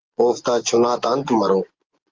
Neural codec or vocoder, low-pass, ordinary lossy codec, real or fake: vocoder, 24 kHz, 100 mel bands, Vocos; 7.2 kHz; Opus, 32 kbps; fake